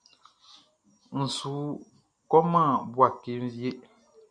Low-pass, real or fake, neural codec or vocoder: 9.9 kHz; real; none